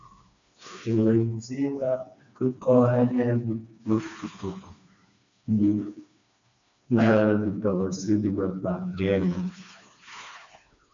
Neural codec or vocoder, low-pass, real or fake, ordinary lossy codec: codec, 16 kHz, 2 kbps, FreqCodec, smaller model; 7.2 kHz; fake; MP3, 64 kbps